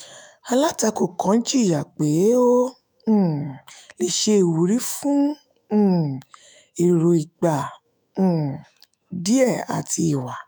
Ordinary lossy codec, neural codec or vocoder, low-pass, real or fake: none; autoencoder, 48 kHz, 128 numbers a frame, DAC-VAE, trained on Japanese speech; none; fake